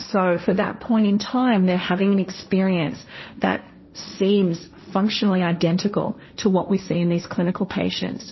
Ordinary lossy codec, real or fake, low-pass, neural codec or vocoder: MP3, 24 kbps; fake; 7.2 kHz; codec, 16 kHz, 1.1 kbps, Voila-Tokenizer